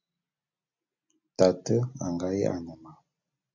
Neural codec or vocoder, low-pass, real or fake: none; 7.2 kHz; real